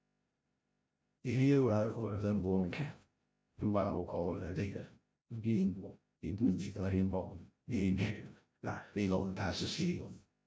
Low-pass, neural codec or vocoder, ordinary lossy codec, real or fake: none; codec, 16 kHz, 0.5 kbps, FreqCodec, larger model; none; fake